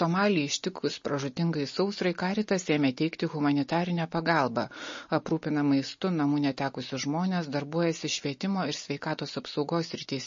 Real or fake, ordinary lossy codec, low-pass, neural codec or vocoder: real; MP3, 32 kbps; 7.2 kHz; none